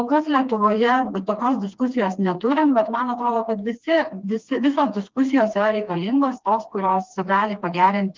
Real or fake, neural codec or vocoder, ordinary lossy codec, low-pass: fake; codec, 16 kHz, 2 kbps, FreqCodec, smaller model; Opus, 32 kbps; 7.2 kHz